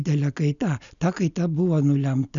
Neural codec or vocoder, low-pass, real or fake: none; 7.2 kHz; real